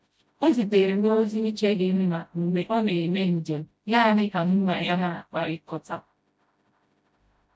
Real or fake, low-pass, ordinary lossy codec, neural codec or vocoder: fake; none; none; codec, 16 kHz, 0.5 kbps, FreqCodec, smaller model